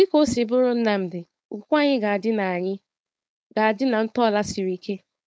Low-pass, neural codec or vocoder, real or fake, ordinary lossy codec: none; codec, 16 kHz, 4.8 kbps, FACodec; fake; none